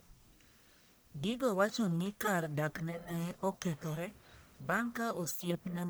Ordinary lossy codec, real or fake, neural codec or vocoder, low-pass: none; fake; codec, 44.1 kHz, 1.7 kbps, Pupu-Codec; none